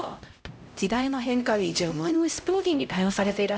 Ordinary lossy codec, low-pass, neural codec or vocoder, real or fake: none; none; codec, 16 kHz, 0.5 kbps, X-Codec, HuBERT features, trained on LibriSpeech; fake